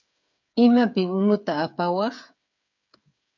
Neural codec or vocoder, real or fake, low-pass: codec, 16 kHz, 8 kbps, FreqCodec, smaller model; fake; 7.2 kHz